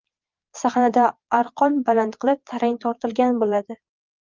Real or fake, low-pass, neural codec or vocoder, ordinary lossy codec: fake; 7.2 kHz; vocoder, 22.05 kHz, 80 mel bands, Vocos; Opus, 32 kbps